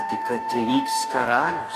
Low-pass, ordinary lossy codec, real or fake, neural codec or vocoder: 14.4 kHz; AAC, 96 kbps; fake; codec, 44.1 kHz, 2.6 kbps, DAC